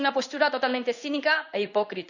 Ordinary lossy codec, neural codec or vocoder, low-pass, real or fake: none; codec, 16 kHz in and 24 kHz out, 1 kbps, XY-Tokenizer; 7.2 kHz; fake